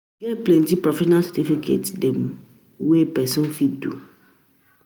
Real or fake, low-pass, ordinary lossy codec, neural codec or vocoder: real; none; none; none